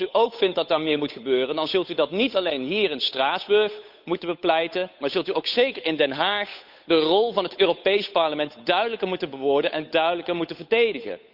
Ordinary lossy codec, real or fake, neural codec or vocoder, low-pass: none; fake; codec, 16 kHz, 8 kbps, FunCodec, trained on Chinese and English, 25 frames a second; 5.4 kHz